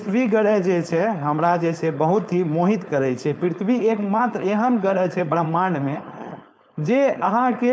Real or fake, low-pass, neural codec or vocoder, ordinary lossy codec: fake; none; codec, 16 kHz, 4.8 kbps, FACodec; none